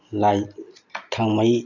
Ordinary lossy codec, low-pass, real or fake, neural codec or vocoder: none; 7.2 kHz; real; none